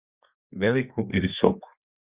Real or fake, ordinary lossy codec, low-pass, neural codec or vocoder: fake; Opus, 64 kbps; 3.6 kHz; codec, 32 kHz, 1.9 kbps, SNAC